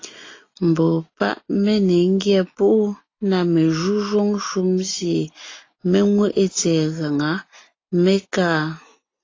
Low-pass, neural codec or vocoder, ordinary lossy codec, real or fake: 7.2 kHz; none; AAC, 32 kbps; real